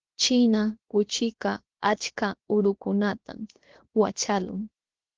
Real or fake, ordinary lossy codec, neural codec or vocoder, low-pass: fake; Opus, 16 kbps; codec, 16 kHz, 0.7 kbps, FocalCodec; 7.2 kHz